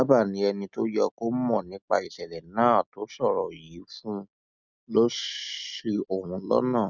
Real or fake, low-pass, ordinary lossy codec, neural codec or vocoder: real; 7.2 kHz; none; none